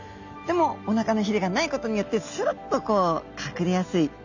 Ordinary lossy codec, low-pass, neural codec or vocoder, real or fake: none; 7.2 kHz; none; real